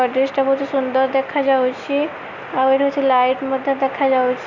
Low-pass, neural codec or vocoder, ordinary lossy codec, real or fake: 7.2 kHz; none; Opus, 64 kbps; real